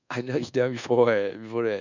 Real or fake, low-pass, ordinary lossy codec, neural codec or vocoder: fake; 7.2 kHz; none; codec, 24 kHz, 1.2 kbps, DualCodec